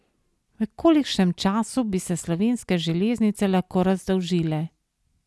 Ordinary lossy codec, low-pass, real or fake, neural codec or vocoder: none; none; real; none